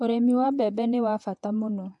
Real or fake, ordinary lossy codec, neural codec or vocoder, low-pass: fake; AAC, 64 kbps; vocoder, 48 kHz, 128 mel bands, Vocos; 10.8 kHz